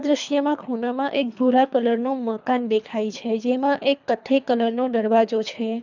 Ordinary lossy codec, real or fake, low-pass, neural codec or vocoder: none; fake; 7.2 kHz; codec, 24 kHz, 3 kbps, HILCodec